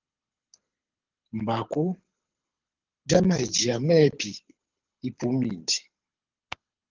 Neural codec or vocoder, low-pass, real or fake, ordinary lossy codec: codec, 24 kHz, 6 kbps, HILCodec; 7.2 kHz; fake; Opus, 32 kbps